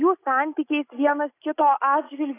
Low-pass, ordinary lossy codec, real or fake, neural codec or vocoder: 3.6 kHz; AAC, 24 kbps; real; none